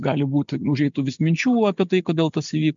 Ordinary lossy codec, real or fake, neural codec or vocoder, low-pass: MP3, 64 kbps; real; none; 7.2 kHz